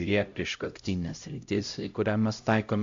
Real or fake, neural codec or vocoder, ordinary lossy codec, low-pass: fake; codec, 16 kHz, 0.5 kbps, X-Codec, HuBERT features, trained on LibriSpeech; AAC, 64 kbps; 7.2 kHz